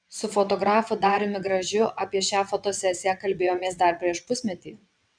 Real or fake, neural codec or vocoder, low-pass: fake; vocoder, 22.05 kHz, 80 mel bands, WaveNeXt; 9.9 kHz